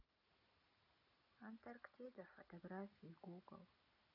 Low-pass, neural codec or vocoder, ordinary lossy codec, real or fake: 5.4 kHz; none; none; real